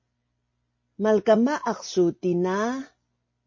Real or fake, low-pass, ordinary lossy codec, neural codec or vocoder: real; 7.2 kHz; MP3, 32 kbps; none